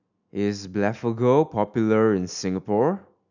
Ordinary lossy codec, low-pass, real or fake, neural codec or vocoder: none; 7.2 kHz; real; none